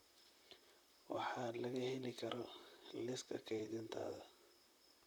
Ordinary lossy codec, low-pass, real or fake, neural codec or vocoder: none; none; fake; vocoder, 44.1 kHz, 128 mel bands, Pupu-Vocoder